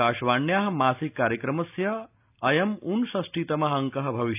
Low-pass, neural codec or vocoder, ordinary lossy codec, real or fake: 3.6 kHz; none; none; real